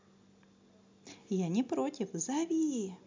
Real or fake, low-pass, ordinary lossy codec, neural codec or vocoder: real; 7.2 kHz; MP3, 64 kbps; none